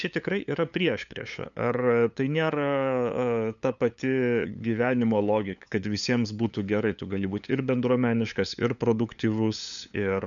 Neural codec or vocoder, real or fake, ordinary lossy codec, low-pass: codec, 16 kHz, 8 kbps, FunCodec, trained on LibriTTS, 25 frames a second; fake; MP3, 96 kbps; 7.2 kHz